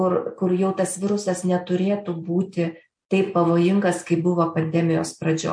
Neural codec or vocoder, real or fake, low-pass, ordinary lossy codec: none; real; 9.9 kHz; MP3, 48 kbps